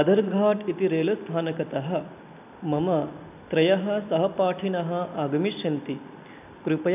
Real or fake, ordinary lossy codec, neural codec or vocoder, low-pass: real; AAC, 32 kbps; none; 3.6 kHz